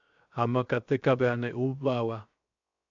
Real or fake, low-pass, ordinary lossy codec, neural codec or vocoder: fake; 7.2 kHz; AAC, 64 kbps; codec, 16 kHz, 0.7 kbps, FocalCodec